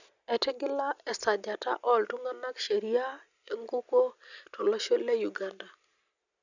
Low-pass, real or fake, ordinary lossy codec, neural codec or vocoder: 7.2 kHz; real; none; none